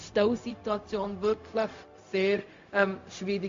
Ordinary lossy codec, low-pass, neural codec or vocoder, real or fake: MP3, 48 kbps; 7.2 kHz; codec, 16 kHz, 0.4 kbps, LongCat-Audio-Codec; fake